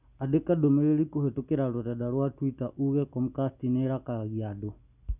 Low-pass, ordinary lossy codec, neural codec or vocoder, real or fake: 3.6 kHz; none; none; real